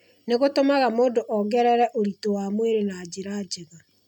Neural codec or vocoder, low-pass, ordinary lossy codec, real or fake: none; 19.8 kHz; none; real